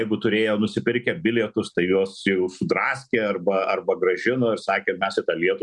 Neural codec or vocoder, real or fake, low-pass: none; real; 10.8 kHz